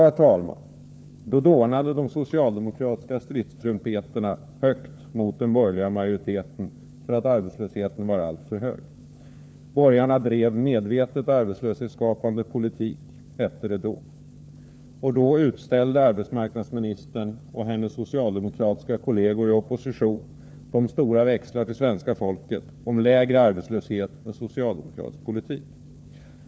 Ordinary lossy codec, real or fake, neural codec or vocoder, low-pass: none; fake; codec, 16 kHz, 4 kbps, FunCodec, trained on LibriTTS, 50 frames a second; none